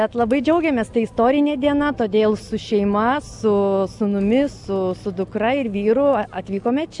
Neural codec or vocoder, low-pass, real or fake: none; 10.8 kHz; real